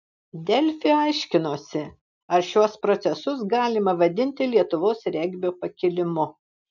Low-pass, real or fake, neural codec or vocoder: 7.2 kHz; real; none